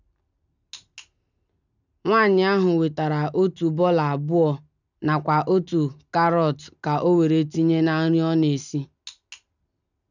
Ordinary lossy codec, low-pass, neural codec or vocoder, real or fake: none; 7.2 kHz; none; real